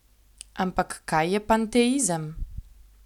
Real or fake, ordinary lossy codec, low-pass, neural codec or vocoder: real; none; 19.8 kHz; none